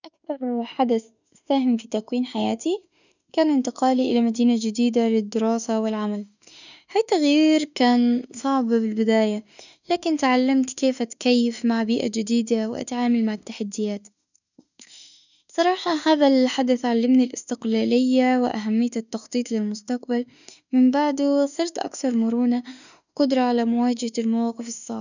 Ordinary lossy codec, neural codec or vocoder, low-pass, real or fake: none; autoencoder, 48 kHz, 32 numbers a frame, DAC-VAE, trained on Japanese speech; 7.2 kHz; fake